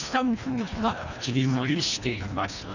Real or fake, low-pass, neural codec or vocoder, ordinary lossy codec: fake; 7.2 kHz; codec, 24 kHz, 1.5 kbps, HILCodec; none